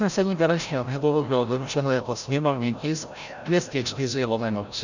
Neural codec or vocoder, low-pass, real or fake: codec, 16 kHz, 0.5 kbps, FreqCodec, larger model; 7.2 kHz; fake